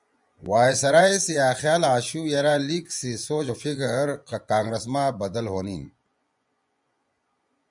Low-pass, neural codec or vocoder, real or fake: 10.8 kHz; vocoder, 24 kHz, 100 mel bands, Vocos; fake